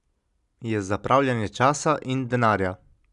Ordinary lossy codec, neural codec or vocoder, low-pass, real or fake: none; none; 10.8 kHz; real